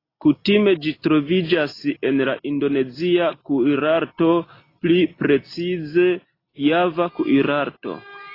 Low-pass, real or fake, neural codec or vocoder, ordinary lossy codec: 5.4 kHz; real; none; AAC, 24 kbps